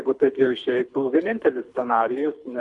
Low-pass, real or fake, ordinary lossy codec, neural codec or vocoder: 10.8 kHz; fake; MP3, 96 kbps; codec, 24 kHz, 3 kbps, HILCodec